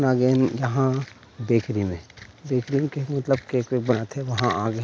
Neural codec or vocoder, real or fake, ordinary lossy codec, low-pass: none; real; none; none